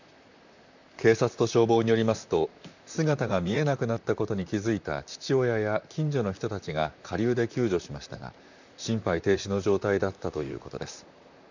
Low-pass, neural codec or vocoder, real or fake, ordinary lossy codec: 7.2 kHz; vocoder, 44.1 kHz, 128 mel bands, Pupu-Vocoder; fake; none